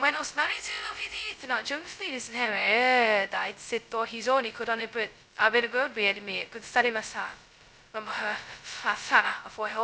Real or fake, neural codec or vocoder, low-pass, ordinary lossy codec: fake; codec, 16 kHz, 0.2 kbps, FocalCodec; none; none